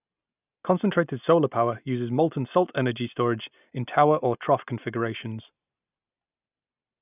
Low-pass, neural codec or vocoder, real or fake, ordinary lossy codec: 3.6 kHz; none; real; none